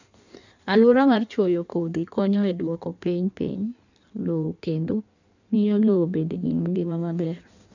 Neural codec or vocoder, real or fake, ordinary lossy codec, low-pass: codec, 16 kHz in and 24 kHz out, 1.1 kbps, FireRedTTS-2 codec; fake; none; 7.2 kHz